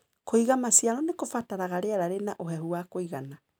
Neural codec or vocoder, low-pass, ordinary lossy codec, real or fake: none; none; none; real